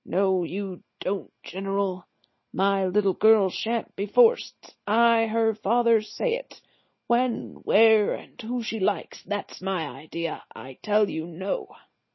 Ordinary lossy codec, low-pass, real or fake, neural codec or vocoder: MP3, 24 kbps; 7.2 kHz; real; none